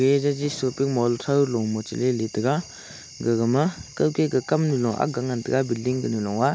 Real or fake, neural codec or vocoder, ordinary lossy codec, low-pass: real; none; none; none